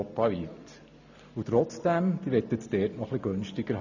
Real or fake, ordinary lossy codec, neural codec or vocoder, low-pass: real; none; none; 7.2 kHz